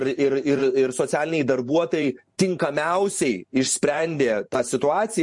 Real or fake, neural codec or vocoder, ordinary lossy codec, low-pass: fake; vocoder, 44.1 kHz, 128 mel bands every 512 samples, BigVGAN v2; MP3, 48 kbps; 10.8 kHz